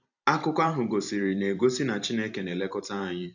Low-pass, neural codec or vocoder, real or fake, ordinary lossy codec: 7.2 kHz; vocoder, 44.1 kHz, 128 mel bands every 256 samples, BigVGAN v2; fake; none